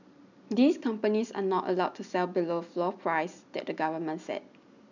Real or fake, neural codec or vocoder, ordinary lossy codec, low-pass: real; none; none; 7.2 kHz